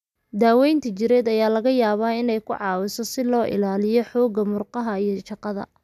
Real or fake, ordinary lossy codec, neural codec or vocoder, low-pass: real; none; none; 14.4 kHz